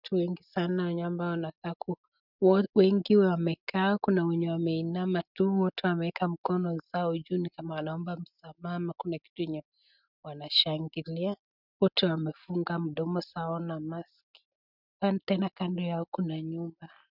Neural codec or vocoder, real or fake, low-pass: none; real; 5.4 kHz